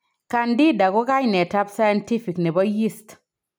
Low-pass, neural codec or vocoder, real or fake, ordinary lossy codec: none; none; real; none